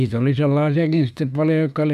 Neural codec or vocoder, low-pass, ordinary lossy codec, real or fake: autoencoder, 48 kHz, 32 numbers a frame, DAC-VAE, trained on Japanese speech; 14.4 kHz; none; fake